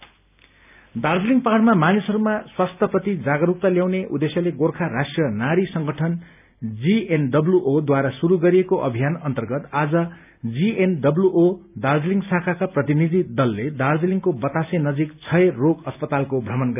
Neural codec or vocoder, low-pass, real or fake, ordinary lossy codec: none; 3.6 kHz; real; none